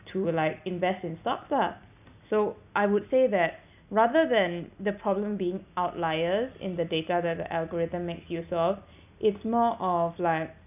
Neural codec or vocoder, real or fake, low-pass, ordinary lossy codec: none; real; 3.6 kHz; none